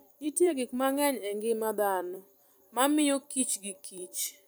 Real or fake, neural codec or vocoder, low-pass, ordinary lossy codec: fake; vocoder, 44.1 kHz, 128 mel bands every 256 samples, BigVGAN v2; none; none